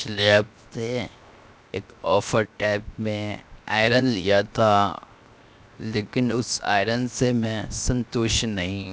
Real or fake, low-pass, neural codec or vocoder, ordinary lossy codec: fake; none; codec, 16 kHz, 0.7 kbps, FocalCodec; none